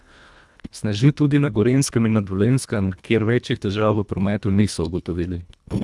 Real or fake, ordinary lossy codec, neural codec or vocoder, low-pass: fake; none; codec, 24 kHz, 1.5 kbps, HILCodec; none